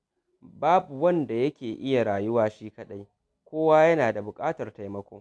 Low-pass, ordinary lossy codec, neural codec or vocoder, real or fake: none; none; none; real